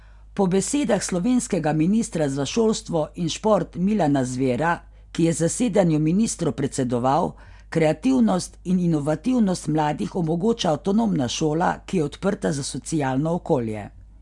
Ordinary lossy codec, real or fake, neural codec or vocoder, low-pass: none; real; none; 10.8 kHz